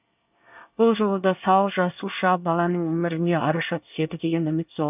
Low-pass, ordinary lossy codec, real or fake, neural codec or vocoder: 3.6 kHz; none; fake; codec, 24 kHz, 1 kbps, SNAC